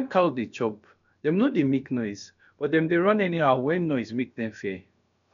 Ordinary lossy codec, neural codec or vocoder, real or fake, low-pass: none; codec, 16 kHz, about 1 kbps, DyCAST, with the encoder's durations; fake; 7.2 kHz